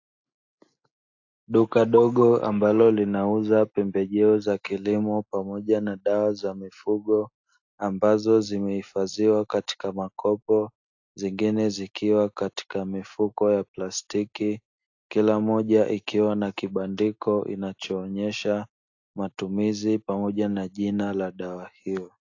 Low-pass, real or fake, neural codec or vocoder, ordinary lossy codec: 7.2 kHz; real; none; AAC, 48 kbps